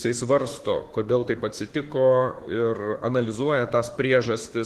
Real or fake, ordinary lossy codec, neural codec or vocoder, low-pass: fake; Opus, 16 kbps; autoencoder, 48 kHz, 32 numbers a frame, DAC-VAE, trained on Japanese speech; 14.4 kHz